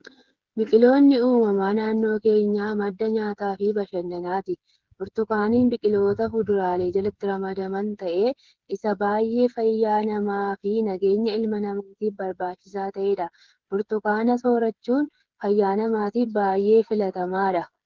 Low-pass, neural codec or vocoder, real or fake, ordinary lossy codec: 7.2 kHz; codec, 16 kHz, 8 kbps, FreqCodec, smaller model; fake; Opus, 16 kbps